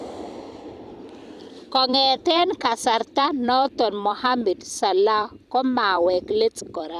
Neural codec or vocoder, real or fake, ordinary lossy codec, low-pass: vocoder, 44.1 kHz, 128 mel bands every 256 samples, BigVGAN v2; fake; none; 14.4 kHz